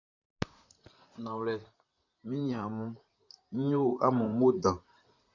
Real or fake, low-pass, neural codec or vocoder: fake; 7.2 kHz; vocoder, 44.1 kHz, 128 mel bands, Pupu-Vocoder